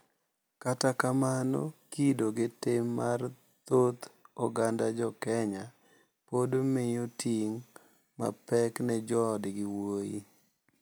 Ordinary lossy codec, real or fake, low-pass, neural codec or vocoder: none; real; none; none